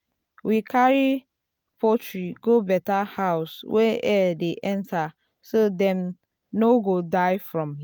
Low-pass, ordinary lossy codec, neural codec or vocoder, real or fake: 19.8 kHz; none; none; real